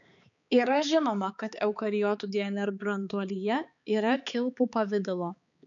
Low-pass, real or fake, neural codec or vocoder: 7.2 kHz; fake; codec, 16 kHz, 4 kbps, X-Codec, HuBERT features, trained on balanced general audio